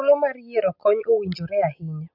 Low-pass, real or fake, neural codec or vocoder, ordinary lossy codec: 5.4 kHz; real; none; MP3, 48 kbps